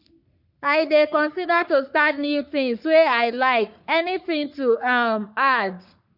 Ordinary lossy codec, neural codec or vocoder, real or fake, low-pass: none; codec, 44.1 kHz, 3.4 kbps, Pupu-Codec; fake; 5.4 kHz